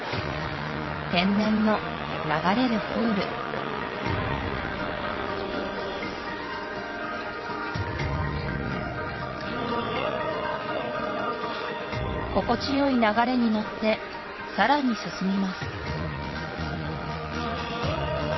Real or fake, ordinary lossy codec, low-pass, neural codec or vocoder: fake; MP3, 24 kbps; 7.2 kHz; vocoder, 22.05 kHz, 80 mel bands, WaveNeXt